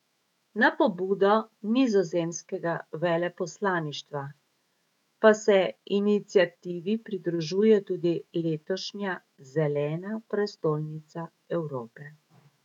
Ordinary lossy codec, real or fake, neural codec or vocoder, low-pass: none; fake; autoencoder, 48 kHz, 128 numbers a frame, DAC-VAE, trained on Japanese speech; 19.8 kHz